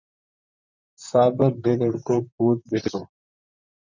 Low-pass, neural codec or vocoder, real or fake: 7.2 kHz; vocoder, 44.1 kHz, 128 mel bands, Pupu-Vocoder; fake